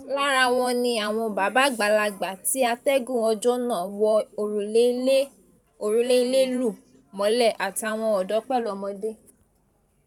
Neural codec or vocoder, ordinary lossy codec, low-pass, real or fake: vocoder, 44.1 kHz, 128 mel bands every 512 samples, BigVGAN v2; none; 19.8 kHz; fake